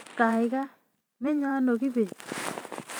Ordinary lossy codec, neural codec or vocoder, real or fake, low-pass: none; vocoder, 44.1 kHz, 128 mel bands every 512 samples, BigVGAN v2; fake; none